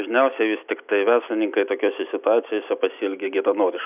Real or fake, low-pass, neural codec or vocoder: real; 3.6 kHz; none